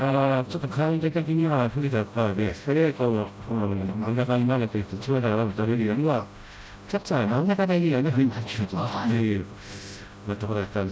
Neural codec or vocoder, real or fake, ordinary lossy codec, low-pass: codec, 16 kHz, 0.5 kbps, FreqCodec, smaller model; fake; none; none